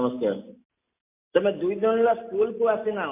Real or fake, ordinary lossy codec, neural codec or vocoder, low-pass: real; MP3, 24 kbps; none; 3.6 kHz